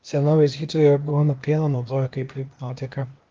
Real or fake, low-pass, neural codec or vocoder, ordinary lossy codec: fake; 7.2 kHz; codec, 16 kHz, 0.8 kbps, ZipCodec; Opus, 32 kbps